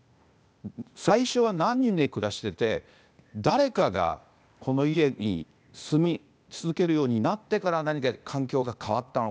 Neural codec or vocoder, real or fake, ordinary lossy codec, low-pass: codec, 16 kHz, 0.8 kbps, ZipCodec; fake; none; none